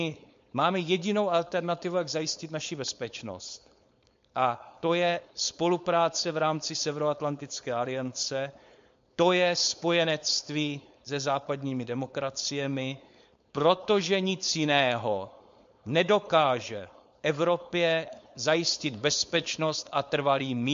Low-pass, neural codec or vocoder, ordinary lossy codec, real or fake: 7.2 kHz; codec, 16 kHz, 4.8 kbps, FACodec; MP3, 48 kbps; fake